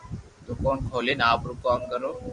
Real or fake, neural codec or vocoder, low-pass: fake; vocoder, 44.1 kHz, 128 mel bands every 256 samples, BigVGAN v2; 10.8 kHz